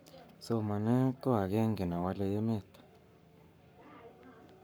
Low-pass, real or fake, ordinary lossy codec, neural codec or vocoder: none; fake; none; codec, 44.1 kHz, 7.8 kbps, Pupu-Codec